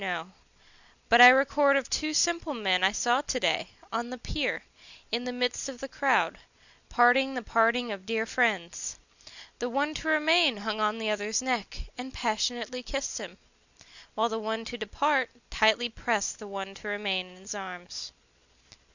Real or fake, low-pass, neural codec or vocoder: real; 7.2 kHz; none